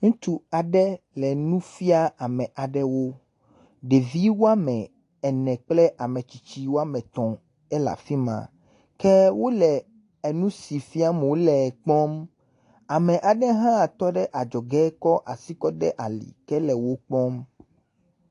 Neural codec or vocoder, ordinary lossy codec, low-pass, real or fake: none; AAC, 48 kbps; 10.8 kHz; real